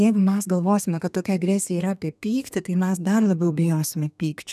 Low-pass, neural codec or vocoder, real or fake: 14.4 kHz; codec, 32 kHz, 1.9 kbps, SNAC; fake